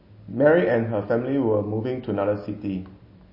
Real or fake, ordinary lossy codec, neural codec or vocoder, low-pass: real; MP3, 24 kbps; none; 5.4 kHz